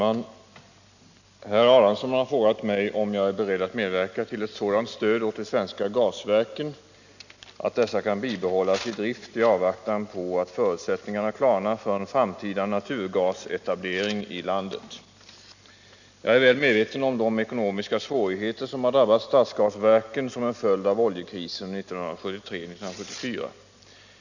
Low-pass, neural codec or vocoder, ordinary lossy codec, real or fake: 7.2 kHz; none; none; real